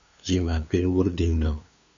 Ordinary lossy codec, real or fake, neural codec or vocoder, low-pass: AAC, 64 kbps; fake; codec, 16 kHz, 2 kbps, FunCodec, trained on LibriTTS, 25 frames a second; 7.2 kHz